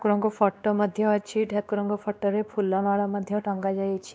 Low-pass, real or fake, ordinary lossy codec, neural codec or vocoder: none; fake; none; codec, 16 kHz, 2 kbps, X-Codec, WavLM features, trained on Multilingual LibriSpeech